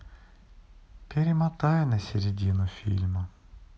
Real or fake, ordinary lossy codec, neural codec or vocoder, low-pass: real; none; none; none